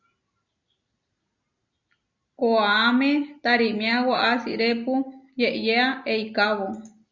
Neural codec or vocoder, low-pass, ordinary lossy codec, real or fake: none; 7.2 kHz; Opus, 64 kbps; real